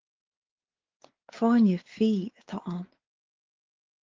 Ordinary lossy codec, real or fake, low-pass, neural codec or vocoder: Opus, 16 kbps; fake; 7.2 kHz; codec, 16 kHz, 4 kbps, X-Codec, WavLM features, trained on Multilingual LibriSpeech